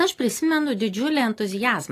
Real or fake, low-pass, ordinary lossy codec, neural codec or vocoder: real; 14.4 kHz; AAC, 48 kbps; none